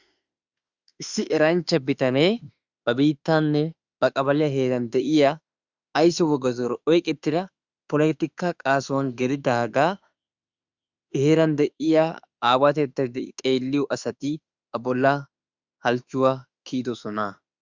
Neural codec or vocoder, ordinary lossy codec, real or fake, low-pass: autoencoder, 48 kHz, 32 numbers a frame, DAC-VAE, trained on Japanese speech; Opus, 64 kbps; fake; 7.2 kHz